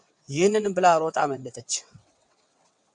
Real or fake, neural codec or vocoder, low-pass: fake; vocoder, 22.05 kHz, 80 mel bands, WaveNeXt; 9.9 kHz